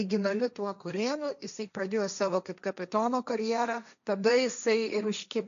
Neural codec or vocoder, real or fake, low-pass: codec, 16 kHz, 1.1 kbps, Voila-Tokenizer; fake; 7.2 kHz